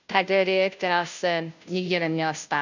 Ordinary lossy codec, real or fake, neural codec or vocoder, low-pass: none; fake; codec, 16 kHz, 0.5 kbps, FunCodec, trained on Chinese and English, 25 frames a second; 7.2 kHz